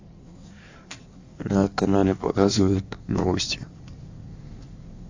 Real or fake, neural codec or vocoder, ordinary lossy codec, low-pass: fake; codec, 16 kHz in and 24 kHz out, 1.1 kbps, FireRedTTS-2 codec; AAC, 48 kbps; 7.2 kHz